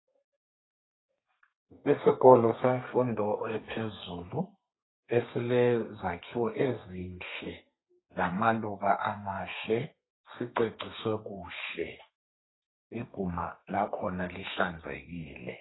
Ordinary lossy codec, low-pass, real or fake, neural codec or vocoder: AAC, 16 kbps; 7.2 kHz; fake; codec, 32 kHz, 1.9 kbps, SNAC